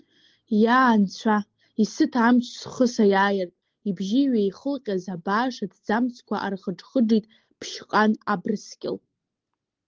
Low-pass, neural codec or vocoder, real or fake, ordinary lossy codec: 7.2 kHz; none; real; Opus, 16 kbps